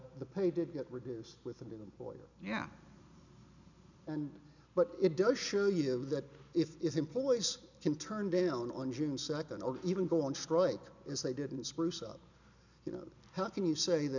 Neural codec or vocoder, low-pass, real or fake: none; 7.2 kHz; real